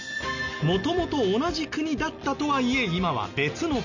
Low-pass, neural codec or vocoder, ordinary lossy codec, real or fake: 7.2 kHz; none; none; real